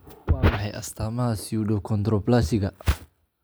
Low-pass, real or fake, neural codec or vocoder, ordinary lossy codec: none; real; none; none